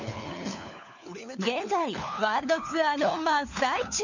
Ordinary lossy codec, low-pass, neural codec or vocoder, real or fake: none; 7.2 kHz; codec, 16 kHz, 4 kbps, FunCodec, trained on LibriTTS, 50 frames a second; fake